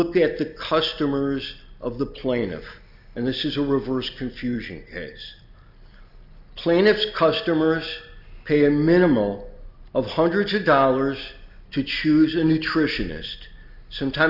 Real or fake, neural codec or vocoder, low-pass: real; none; 5.4 kHz